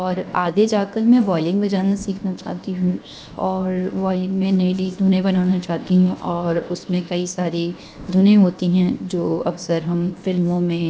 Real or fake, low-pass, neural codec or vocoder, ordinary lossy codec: fake; none; codec, 16 kHz, 0.7 kbps, FocalCodec; none